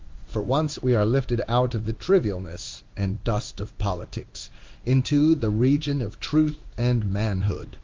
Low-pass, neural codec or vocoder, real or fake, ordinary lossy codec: 7.2 kHz; codec, 16 kHz in and 24 kHz out, 1 kbps, XY-Tokenizer; fake; Opus, 32 kbps